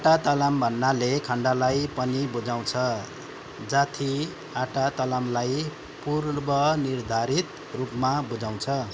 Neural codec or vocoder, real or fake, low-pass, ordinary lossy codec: none; real; none; none